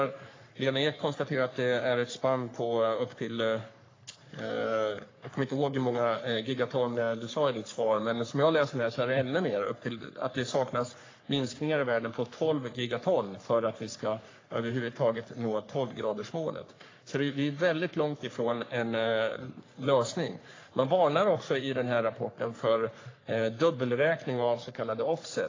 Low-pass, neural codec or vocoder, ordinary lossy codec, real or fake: 7.2 kHz; codec, 44.1 kHz, 3.4 kbps, Pupu-Codec; AAC, 32 kbps; fake